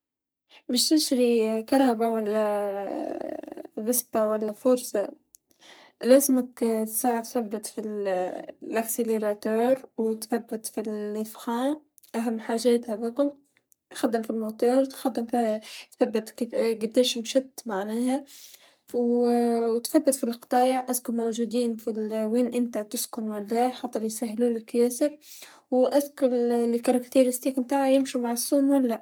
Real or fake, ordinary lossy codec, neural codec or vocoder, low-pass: fake; none; codec, 44.1 kHz, 3.4 kbps, Pupu-Codec; none